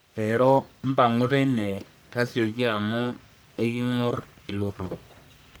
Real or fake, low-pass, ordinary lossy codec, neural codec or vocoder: fake; none; none; codec, 44.1 kHz, 1.7 kbps, Pupu-Codec